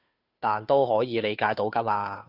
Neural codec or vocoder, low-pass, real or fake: codec, 16 kHz, 2 kbps, FunCodec, trained on Chinese and English, 25 frames a second; 5.4 kHz; fake